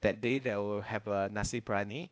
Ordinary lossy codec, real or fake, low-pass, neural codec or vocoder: none; fake; none; codec, 16 kHz, 0.8 kbps, ZipCodec